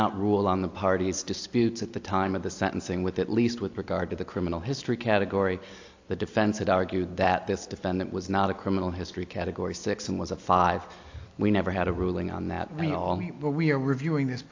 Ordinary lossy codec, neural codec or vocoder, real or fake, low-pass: AAC, 48 kbps; none; real; 7.2 kHz